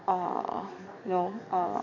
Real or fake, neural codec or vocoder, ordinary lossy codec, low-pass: fake; codec, 44.1 kHz, 7.8 kbps, DAC; none; 7.2 kHz